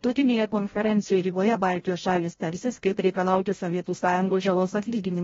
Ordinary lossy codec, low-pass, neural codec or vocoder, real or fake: AAC, 24 kbps; 7.2 kHz; codec, 16 kHz, 0.5 kbps, FreqCodec, larger model; fake